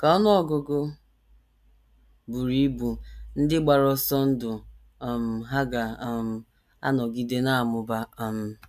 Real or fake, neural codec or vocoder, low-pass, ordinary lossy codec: real; none; 14.4 kHz; AAC, 96 kbps